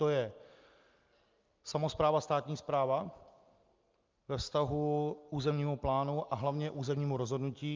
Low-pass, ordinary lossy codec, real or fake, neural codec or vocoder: 7.2 kHz; Opus, 24 kbps; real; none